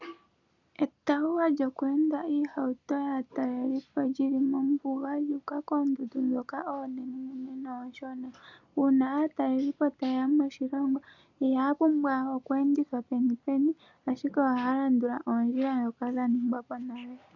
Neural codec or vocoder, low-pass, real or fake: none; 7.2 kHz; real